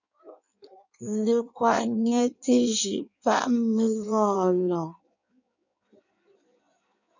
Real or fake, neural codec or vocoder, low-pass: fake; codec, 16 kHz in and 24 kHz out, 1.1 kbps, FireRedTTS-2 codec; 7.2 kHz